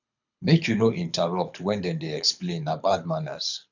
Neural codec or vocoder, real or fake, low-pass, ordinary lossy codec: codec, 24 kHz, 6 kbps, HILCodec; fake; 7.2 kHz; none